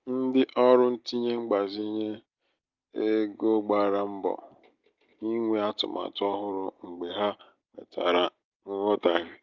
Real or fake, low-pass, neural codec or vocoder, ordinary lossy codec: real; 7.2 kHz; none; Opus, 24 kbps